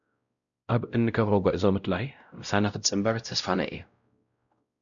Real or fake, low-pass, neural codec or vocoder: fake; 7.2 kHz; codec, 16 kHz, 0.5 kbps, X-Codec, WavLM features, trained on Multilingual LibriSpeech